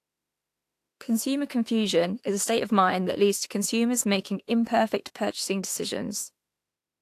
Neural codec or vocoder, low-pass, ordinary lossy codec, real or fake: autoencoder, 48 kHz, 32 numbers a frame, DAC-VAE, trained on Japanese speech; 14.4 kHz; AAC, 64 kbps; fake